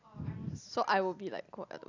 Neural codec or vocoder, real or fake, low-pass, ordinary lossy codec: none; real; 7.2 kHz; none